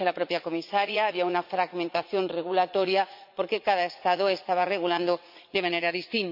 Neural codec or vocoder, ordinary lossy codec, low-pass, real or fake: vocoder, 44.1 kHz, 80 mel bands, Vocos; AAC, 48 kbps; 5.4 kHz; fake